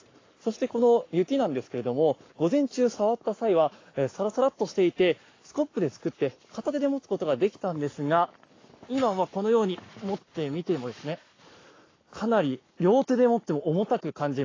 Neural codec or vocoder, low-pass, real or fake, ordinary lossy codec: codec, 44.1 kHz, 7.8 kbps, Pupu-Codec; 7.2 kHz; fake; AAC, 32 kbps